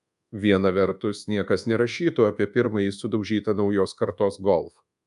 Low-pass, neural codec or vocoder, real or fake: 10.8 kHz; codec, 24 kHz, 1.2 kbps, DualCodec; fake